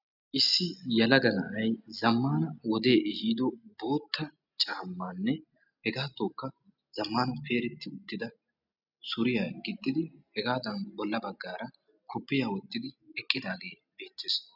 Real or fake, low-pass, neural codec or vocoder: real; 5.4 kHz; none